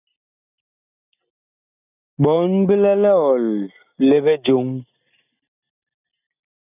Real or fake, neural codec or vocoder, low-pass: real; none; 3.6 kHz